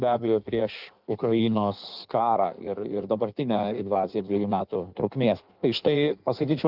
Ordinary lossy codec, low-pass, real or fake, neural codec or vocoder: Opus, 32 kbps; 5.4 kHz; fake; codec, 16 kHz in and 24 kHz out, 1.1 kbps, FireRedTTS-2 codec